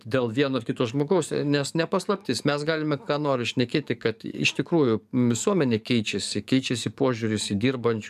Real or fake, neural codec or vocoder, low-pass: fake; codec, 44.1 kHz, 7.8 kbps, DAC; 14.4 kHz